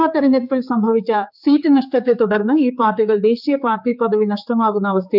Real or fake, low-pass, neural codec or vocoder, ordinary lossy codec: fake; 5.4 kHz; codec, 16 kHz, 4 kbps, X-Codec, HuBERT features, trained on general audio; none